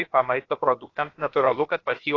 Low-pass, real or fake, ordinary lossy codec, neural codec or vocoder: 7.2 kHz; fake; AAC, 32 kbps; codec, 16 kHz, about 1 kbps, DyCAST, with the encoder's durations